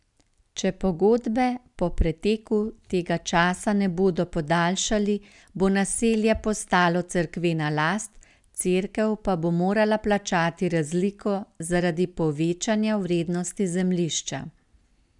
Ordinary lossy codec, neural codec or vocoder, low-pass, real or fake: none; none; 10.8 kHz; real